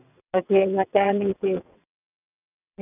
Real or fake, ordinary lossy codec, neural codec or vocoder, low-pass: fake; none; vocoder, 22.05 kHz, 80 mel bands, WaveNeXt; 3.6 kHz